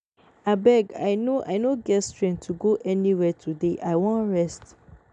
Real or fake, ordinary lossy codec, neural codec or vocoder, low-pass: real; none; none; 9.9 kHz